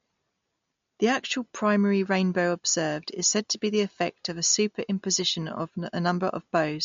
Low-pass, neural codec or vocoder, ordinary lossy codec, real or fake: 7.2 kHz; none; MP3, 48 kbps; real